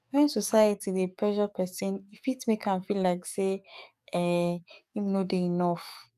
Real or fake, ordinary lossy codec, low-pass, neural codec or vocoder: fake; none; 14.4 kHz; codec, 44.1 kHz, 7.8 kbps, DAC